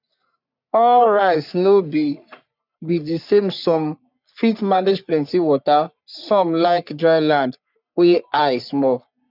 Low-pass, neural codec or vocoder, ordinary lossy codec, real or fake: 5.4 kHz; codec, 44.1 kHz, 3.4 kbps, Pupu-Codec; none; fake